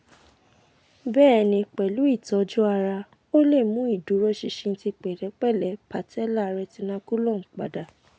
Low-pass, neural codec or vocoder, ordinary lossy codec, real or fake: none; none; none; real